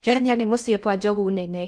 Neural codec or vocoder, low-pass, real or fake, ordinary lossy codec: codec, 16 kHz in and 24 kHz out, 0.6 kbps, FocalCodec, streaming, 2048 codes; 9.9 kHz; fake; none